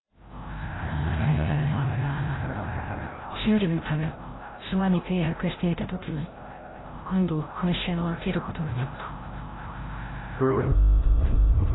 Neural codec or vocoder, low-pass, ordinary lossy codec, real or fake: codec, 16 kHz, 0.5 kbps, FreqCodec, larger model; 7.2 kHz; AAC, 16 kbps; fake